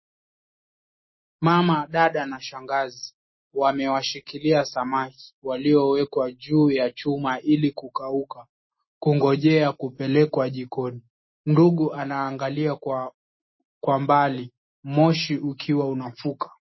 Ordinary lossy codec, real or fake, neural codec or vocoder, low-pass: MP3, 24 kbps; real; none; 7.2 kHz